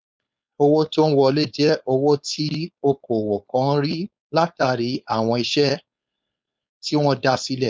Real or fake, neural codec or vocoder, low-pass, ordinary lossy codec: fake; codec, 16 kHz, 4.8 kbps, FACodec; none; none